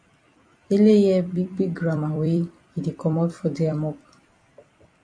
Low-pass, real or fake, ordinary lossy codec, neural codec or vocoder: 9.9 kHz; fake; AAC, 48 kbps; vocoder, 44.1 kHz, 128 mel bands every 256 samples, BigVGAN v2